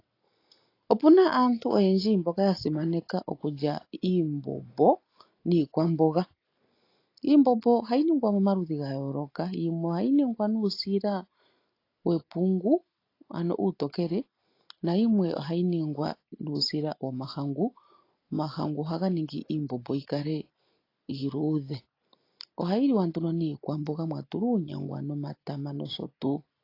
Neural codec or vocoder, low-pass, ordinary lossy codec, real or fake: none; 5.4 kHz; AAC, 32 kbps; real